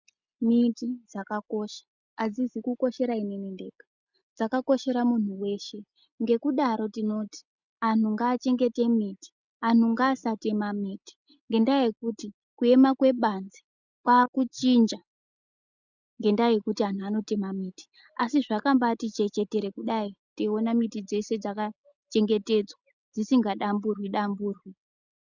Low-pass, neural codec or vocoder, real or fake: 7.2 kHz; none; real